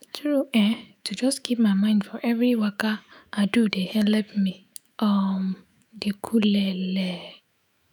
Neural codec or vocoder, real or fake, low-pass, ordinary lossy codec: autoencoder, 48 kHz, 128 numbers a frame, DAC-VAE, trained on Japanese speech; fake; none; none